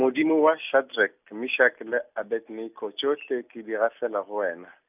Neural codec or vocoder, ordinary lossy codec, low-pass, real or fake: none; none; 3.6 kHz; real